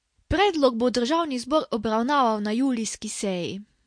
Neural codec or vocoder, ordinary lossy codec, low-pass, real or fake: none; MP3, 48 kbps; 9.9 kHz; real